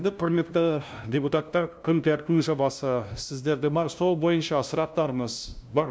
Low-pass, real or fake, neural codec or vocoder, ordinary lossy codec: none; fake; codec, 16 kHz, 0.5 kbps, FunCodec, trained on LibriTTS, 25 frames a second; none